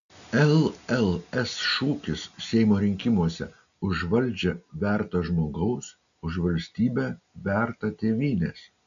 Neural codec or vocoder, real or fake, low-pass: none; real; 7.2 kHz